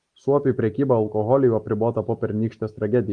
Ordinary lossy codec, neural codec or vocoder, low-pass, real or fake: Opus, 24 kbps; none; 9.9 kHz; real